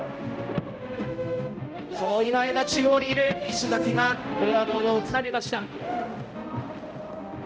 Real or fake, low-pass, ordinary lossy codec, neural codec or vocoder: fake; none; none; codec, 16 kHz, 0.5 kbps, X-Codec, HuBERT features, trained on balanced general audio